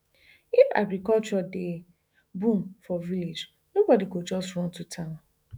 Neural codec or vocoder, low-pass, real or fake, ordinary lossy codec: autoencoder, 48 kHz, 128 numbers a frame, DAC-VAE, trained on Japanese speech; none; fake; none